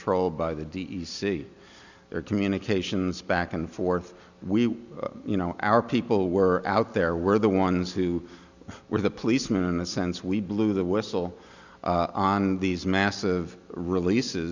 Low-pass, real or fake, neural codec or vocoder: 7.2 kHz; real; none